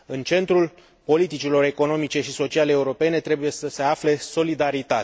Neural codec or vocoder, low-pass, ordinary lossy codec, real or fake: none; none; none; real